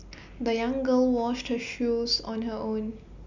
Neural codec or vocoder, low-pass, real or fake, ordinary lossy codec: none; 7.2 kHz; real; none